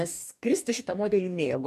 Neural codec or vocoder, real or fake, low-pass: codec, 44.1 kHz, 2.6 kbps, DAC; fake; 14.4 kHz